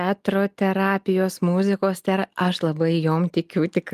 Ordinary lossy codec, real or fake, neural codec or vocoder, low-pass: Opus, 32 kbps; real; none; 14.4 kHz